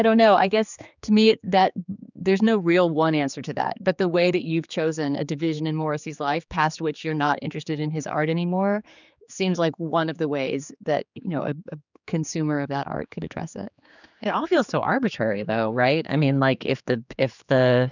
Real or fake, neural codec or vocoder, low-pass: fake; codec, 16 kHz, 4 kbps, X-Codec, HuBERT features, trained on general audio; 7.2 kHz